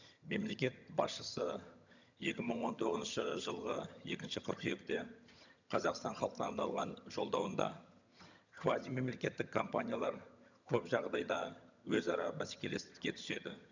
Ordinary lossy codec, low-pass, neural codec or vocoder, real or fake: none; 7.2 kHz; vocoder, 22.05 kHz, 80 mel bands, HiFi-GAN; fake